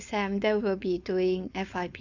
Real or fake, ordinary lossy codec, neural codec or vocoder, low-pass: fake; Opus, 64 kbps; codec, 16 kHz, 4.8 kbps, FACodec; 7.2 kHz